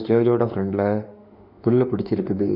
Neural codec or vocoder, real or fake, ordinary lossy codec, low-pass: autoencoder, 48 kHz, 32 numbers a frame, DAC-VAE, trained on Japanese speech; fake; none; 5.4 kHz